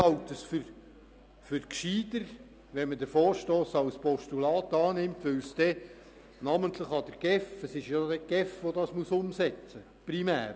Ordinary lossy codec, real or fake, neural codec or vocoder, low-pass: none; real; none; none